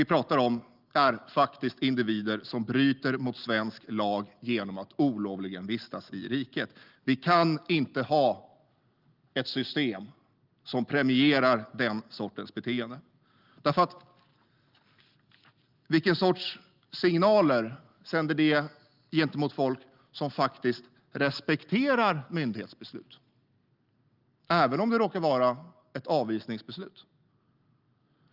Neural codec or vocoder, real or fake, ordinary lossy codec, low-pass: none; real; Opus, 24 kbps; 5.4 kHz